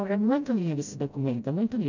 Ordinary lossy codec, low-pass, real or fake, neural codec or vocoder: none; 7.2 kHz; fake; codec, 16 kHz, 0.5 kbps, FreqCodec, smaller model